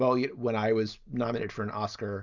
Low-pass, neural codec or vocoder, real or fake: 7.2 kHz; none; real